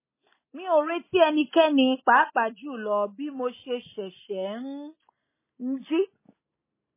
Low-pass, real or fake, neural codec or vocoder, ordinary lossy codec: 3.6 kHz; real; none; MP3, 16 kbps